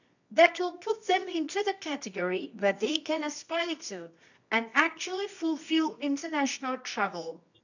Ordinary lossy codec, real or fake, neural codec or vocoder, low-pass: none; fake; codec, 24 kHz, 0.9 kbps, WavTokenizer, medium music audio release; 7.2 kHz